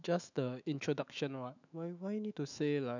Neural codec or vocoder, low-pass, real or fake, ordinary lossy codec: autoencoder, 48 kHz, 128 numbers a frame, DAC-VAE, trained on Japanese speech; 7.2 kHz; fake; none